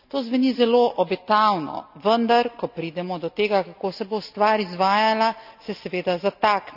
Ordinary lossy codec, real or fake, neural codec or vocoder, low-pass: none; real; none; 5.4 kHz